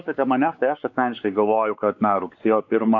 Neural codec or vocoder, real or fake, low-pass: codec, 16 kHz, 4 kbps, X-Codec, WavLM features, trained on Multilingual LibriSpeech; fake; 7.2 kHz